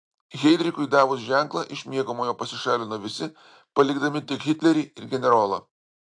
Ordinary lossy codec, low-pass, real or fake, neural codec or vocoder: AAC, 64 kbps; 9.9 kHz; real; none